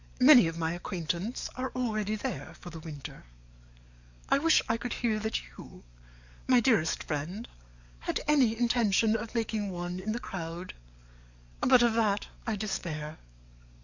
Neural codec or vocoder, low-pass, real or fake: codec, 44.1 kHz, 7.8 kbps, DAC; 7.2 kHz; fake